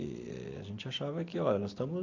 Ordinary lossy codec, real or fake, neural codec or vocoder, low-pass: AAC, 48 kbps; real; none; 7.2 kHz